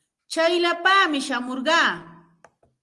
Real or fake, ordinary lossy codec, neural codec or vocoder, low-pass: real; Opus, 24 kbps; none; 10.8 kHz